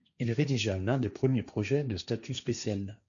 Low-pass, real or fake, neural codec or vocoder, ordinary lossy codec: 7.2 kHz; fake; codec, 16 kHz, 1.1 kbps, Voila-Tokenizer; MP3, 96 kbps